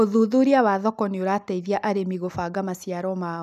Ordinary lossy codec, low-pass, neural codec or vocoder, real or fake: none; 14.4 kHz; none; real